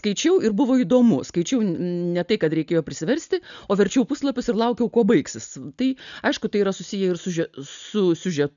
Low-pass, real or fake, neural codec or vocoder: 7.2 kHz; real; none